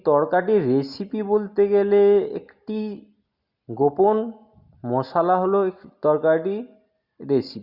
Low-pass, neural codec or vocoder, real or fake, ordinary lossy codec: 5.4 kHz; none; real; Opus, 64 kbps